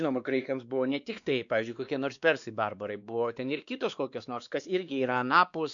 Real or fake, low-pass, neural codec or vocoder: fake; 7.2 kHz; codec, 16 kHz, 2 kbps, X-Codec, WavLM features, trained on Multilingual LibriSpeech